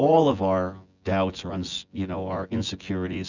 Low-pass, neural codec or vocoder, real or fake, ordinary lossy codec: 7.2 kHz; vocoder, 24 kHz, 100 mel bands, Vocos; fake; Opus, 64 kbps